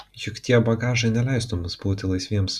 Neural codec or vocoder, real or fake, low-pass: none; real; 14.4 kHz